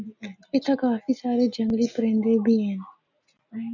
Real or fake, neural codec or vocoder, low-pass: real; none; 7.2 kHz